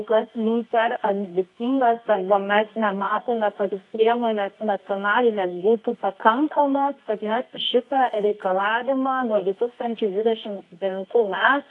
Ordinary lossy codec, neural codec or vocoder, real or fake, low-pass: AAC, 48 kbps; codec, 24 kHz, 0.9 kbps, WavTokenizer, medium music audio release; fake; 10.8 kHz